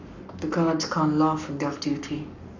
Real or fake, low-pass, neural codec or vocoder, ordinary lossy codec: fake; 7.2 kHz; codec, 44.1 kHz, 7.8 kbps, Pupu-Codec; none